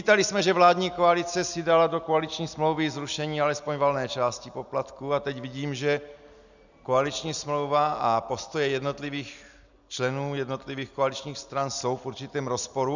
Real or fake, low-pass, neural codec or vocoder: real; 7.2 kHz; none